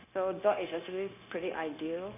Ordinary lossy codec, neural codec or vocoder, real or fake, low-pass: none; codec, 16 kHz, 0.9 kbps, LongCat-Audio-Codec; fake; 3.6 kHz